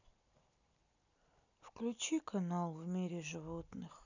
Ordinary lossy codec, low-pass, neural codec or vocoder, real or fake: none; 7.2 kHz; none; real